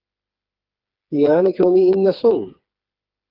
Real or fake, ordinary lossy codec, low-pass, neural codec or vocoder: fake; Opus, 32 kbps; 5.4 kHz; codec, 16 kHz, 4 kbps, FreqCodec, smaller model